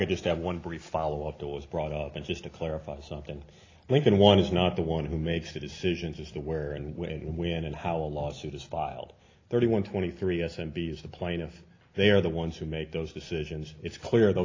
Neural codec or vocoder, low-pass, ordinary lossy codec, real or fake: none; 7.2 kHz; AAC, 32 kbps; real